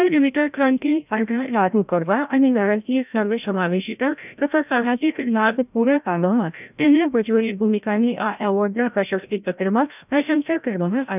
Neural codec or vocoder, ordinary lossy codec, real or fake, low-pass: codec, 16 kHz, 0.5 kbps, FreqCodec, larger model; none; fake; 3.6 kHz